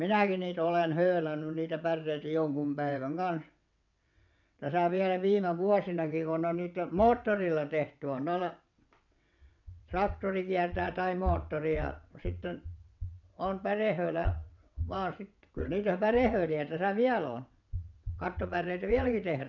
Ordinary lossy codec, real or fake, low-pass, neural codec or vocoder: none; fake; 7.2 kHz; vocoder, 22.05 kHz, 80 mel bands, WaveNeXt